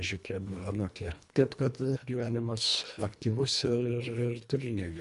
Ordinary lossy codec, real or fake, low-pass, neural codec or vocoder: MP3, 64 kbps; fake; 10.8 kHz; codec, 24 kHz, 1.5 kbps, HILCodec